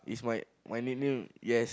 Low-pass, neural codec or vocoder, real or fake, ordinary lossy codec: none; none; real; none